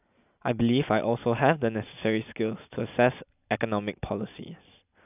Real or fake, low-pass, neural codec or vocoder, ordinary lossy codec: real; 3.6 kHz; none; none